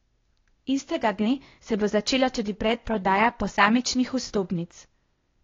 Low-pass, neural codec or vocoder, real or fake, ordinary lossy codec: 7.2 kHz; codec, 16 kHz, 0.8 kbps, ZipCodec; fake; AAC, 32 kbps